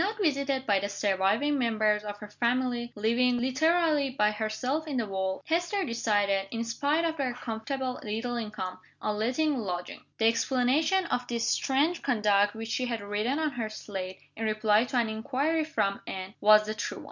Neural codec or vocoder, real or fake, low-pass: none; real; 7.2 kHz